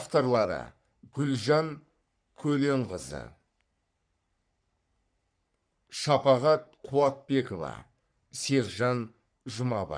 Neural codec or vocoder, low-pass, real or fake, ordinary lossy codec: codec, 44.1 kHz, 3.4 kbps, Pupu-Codec; 9.9 kHz; fake; none